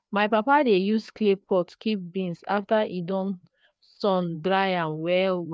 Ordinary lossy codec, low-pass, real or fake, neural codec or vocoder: none; none; fake; codec, 16 kHz, 2 kbps, FreqCodec, larger model